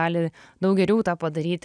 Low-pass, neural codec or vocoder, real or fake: 9.9 kHz; none; real